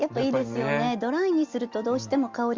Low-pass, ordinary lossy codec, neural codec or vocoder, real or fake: 7.2 kHz; Opus, 32 kbps; none; real